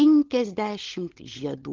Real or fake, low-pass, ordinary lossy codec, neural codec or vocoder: fake; 7.2 kHz; Opus, 16 kbps; codec, 16 kHz, 16 kbps, FunCodec, trained on LibriTTS, 50 frames a second